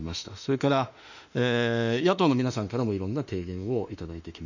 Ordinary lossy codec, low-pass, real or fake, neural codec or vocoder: none; 7.2 kHz; fake; autoencoder, 48 kHz, 32 numbers a frame, DAC-VAE, trained on Japanese speech